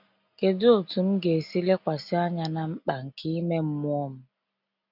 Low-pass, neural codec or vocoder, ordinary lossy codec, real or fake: 5.4 kHz; none; none; real